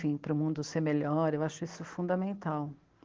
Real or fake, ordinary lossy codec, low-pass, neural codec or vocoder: real; Opus, 16 kbps; 7.2 kHz; none